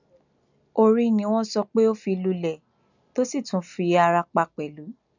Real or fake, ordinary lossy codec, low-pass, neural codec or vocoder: real; none; 7.2 kHz; none